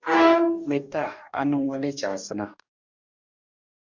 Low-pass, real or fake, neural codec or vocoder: 7.2 kHz; fake; codec, 44.1 kHz, 2.6 kbps, DAC